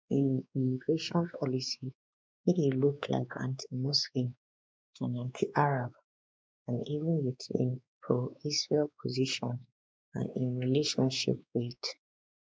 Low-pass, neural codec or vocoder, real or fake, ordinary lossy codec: none; codec, 16 kHz, 2 kbps, X-Codec, WavLM features, trained on Multilingual LibriSpeech; fake; none